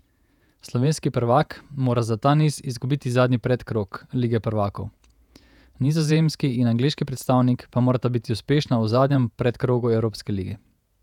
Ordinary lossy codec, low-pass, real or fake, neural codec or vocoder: none; 19.8 kHz; fake; vocoder, 48 kHz, 128 mel bands, Vocos